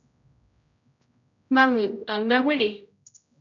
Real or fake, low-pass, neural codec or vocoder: fake; 7.2 kHz; codec, 16 kHz, 0.5 kbps, X-Codec, HuBERT features, trained on general audio